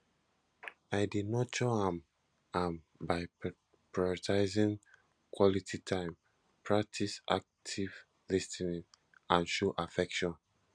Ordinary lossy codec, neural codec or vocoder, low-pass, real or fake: none; none; 9.9 kHz; real